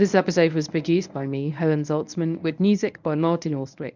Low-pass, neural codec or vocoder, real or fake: 7.2 kHz; codec, 24 kHz, 0.9 kbps, WavTokenizer, medium speech release version 1; fake